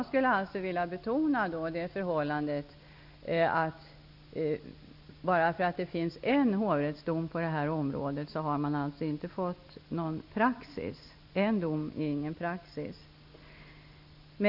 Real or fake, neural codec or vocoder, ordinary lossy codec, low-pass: real; none; none; 5.4 kHz